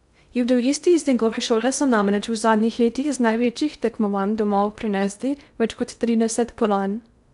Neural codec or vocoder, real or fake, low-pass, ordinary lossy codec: codec, 16 kHz in and 24 kHz out, 0.6 kbps, FocalCodec, streaming, 2048 codes; fake; 10.8 kHz; none